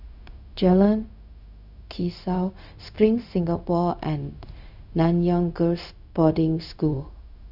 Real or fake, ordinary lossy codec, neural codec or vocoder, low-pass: fake; none; codec, 16 kHz, 0.4 kbps, LongCat-Audio-Codec; 5.4 kHz